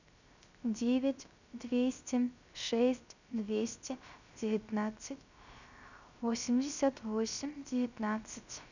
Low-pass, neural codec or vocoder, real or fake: 7.2 kHz; codec, 16 kHz, 0.3 kbps, FocalCodec; fake